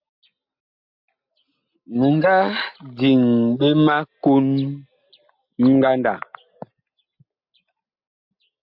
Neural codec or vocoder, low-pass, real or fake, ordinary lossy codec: none; 5.4 kHz; real; MP3, 48 kbps